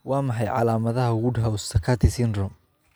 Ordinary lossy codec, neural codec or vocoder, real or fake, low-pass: none; none; real; none